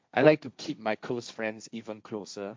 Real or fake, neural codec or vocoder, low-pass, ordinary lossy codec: fake; codec, 16 kHz, 1.1 kbps, Voila-Tokenizer; none; none